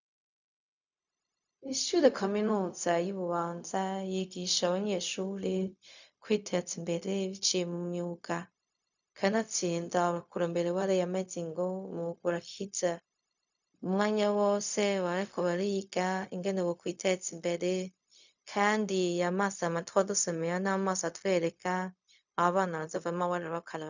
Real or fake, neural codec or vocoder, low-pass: fake; codec, 16 kHz, 0.4 kbps, LongCat-Audio-Codec; 7.2 kHz